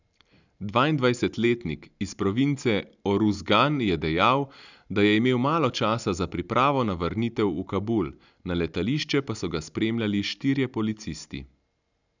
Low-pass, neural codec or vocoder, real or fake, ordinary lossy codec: 7.2 kHz; none; real; none